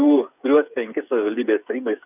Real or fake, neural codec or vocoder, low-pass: fake; codec, 16 kHz, 8 kbps, FreqCodec, smaller model; 3.6 kHz